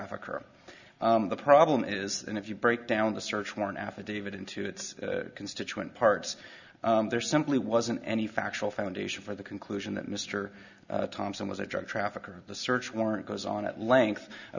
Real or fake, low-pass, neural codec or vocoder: real; 7.2 kHz; none